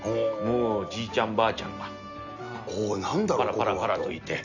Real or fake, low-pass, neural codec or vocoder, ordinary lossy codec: real; 7.2 kHz; none; none